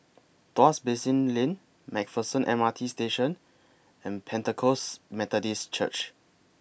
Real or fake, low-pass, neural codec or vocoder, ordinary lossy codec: real; none; none; none